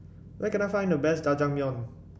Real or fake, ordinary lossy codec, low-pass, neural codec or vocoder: real; none; none; none